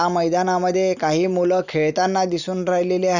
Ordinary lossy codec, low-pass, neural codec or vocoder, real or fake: none; 7.2 kHz; none; real